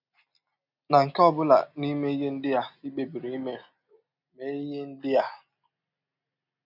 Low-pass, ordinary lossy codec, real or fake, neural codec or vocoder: 5.4 kHz; none; real; none